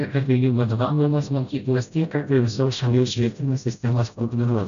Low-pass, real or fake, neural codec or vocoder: 7.2 kHz; fake; codec, 16 kHz, 0.5 kbps, FreqCodec, smaller model